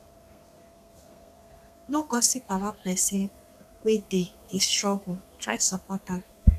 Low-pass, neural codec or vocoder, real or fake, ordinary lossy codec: 14.4 kHz; codec, 32 kHz, 1.9 kbps, SNAC; fake; none